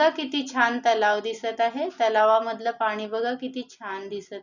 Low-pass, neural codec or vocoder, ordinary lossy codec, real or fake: 7.2 kHz; none; none; real